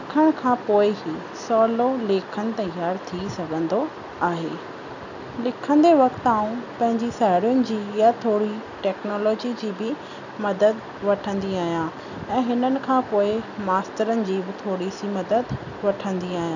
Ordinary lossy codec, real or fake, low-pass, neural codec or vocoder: none; real; 7.2 kHz; none